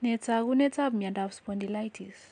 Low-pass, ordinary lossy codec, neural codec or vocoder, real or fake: 9.9 kHz; AAC, 64 kbps; none; real